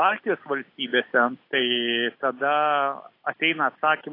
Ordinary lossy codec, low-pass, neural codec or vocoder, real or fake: AAC, 32 kbps; 5.4 kHz; none; real